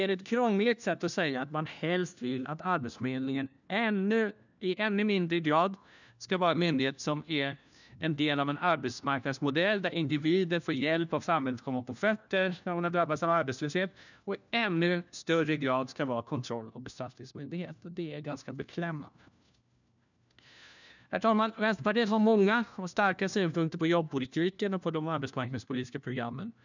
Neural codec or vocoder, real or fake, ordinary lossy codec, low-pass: codec, 16 kHz, 1 kbps, FunCodec, trained on LibriTTS, 50 frames a second; fake; none; 7.2 kHz